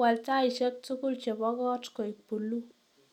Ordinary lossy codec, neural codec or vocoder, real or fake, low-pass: none; none; real; 19.8 kHz